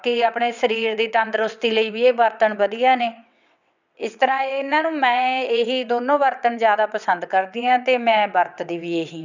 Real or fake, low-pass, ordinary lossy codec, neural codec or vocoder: fake; 7.2 kHz; none; vocoder, 22.05 kHz, 80 mel bands, WaveNeXt